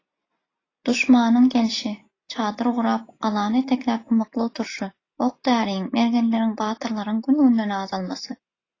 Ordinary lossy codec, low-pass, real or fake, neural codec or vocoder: AAC, 32 kbps; 7.2 kHz; real; none